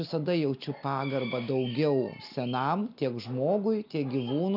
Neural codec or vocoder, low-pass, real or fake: none; 5.4 kHz; real